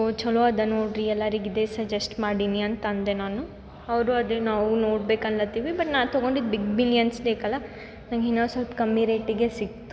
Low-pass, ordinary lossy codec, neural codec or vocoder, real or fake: none; none; none; real